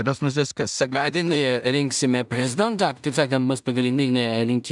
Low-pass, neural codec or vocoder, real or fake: 10.8 kHz; codec, 16 kHz in and 24 kHz out, 0.4 kbps, LongCat-Audio-Codec, two codebook decoder; fake